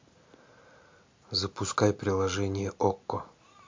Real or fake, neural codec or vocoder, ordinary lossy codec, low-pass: real; none; MP3, 48 kbps; 7.2 kHz